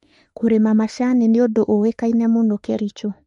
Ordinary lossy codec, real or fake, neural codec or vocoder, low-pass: MP3, 48 kbps; fake; autoencoder, 48 kHz, 32 numbers a frame, DAC-VAE, trained on Japanese speech; 19.8 kHz